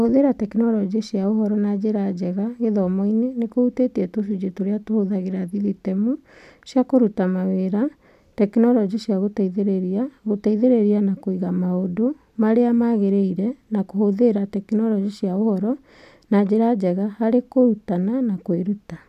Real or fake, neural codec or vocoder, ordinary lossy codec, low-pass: real; none; none; 14.4 kHz